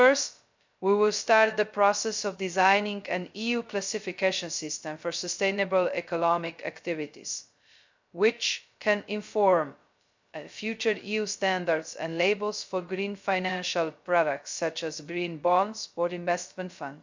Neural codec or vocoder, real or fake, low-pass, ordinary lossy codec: codec, 16 kHz, 0.2 kbps, FocalCodec; fake; 7.2 kHz; MP3, 64 kbps